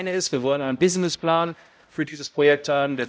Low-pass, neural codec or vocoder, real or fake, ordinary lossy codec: none; codec, 16 kHz, 0.5 kbps, X-Codec, HuBERT features, trained on balanced general audio; fake; none